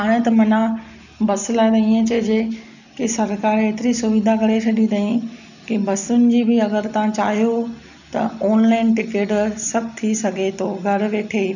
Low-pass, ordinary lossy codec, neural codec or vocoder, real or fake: 7.2 kHz; none; none; real